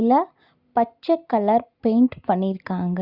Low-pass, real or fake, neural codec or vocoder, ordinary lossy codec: 5.4 kHz; real; none; Opus, 64 kbps